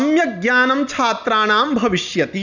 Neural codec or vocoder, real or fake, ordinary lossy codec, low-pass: none; real; none; 7.2 kHz